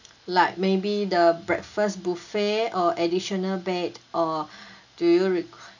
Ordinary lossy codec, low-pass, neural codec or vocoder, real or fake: none; 7.2 kHz; none; real